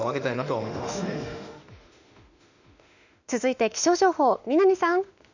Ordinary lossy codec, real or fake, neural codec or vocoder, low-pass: none; fake; autoencoder, 48 kHz, 32 numbers a frame, DAC-VAE, trained on Japanese speech; 7.2 kHz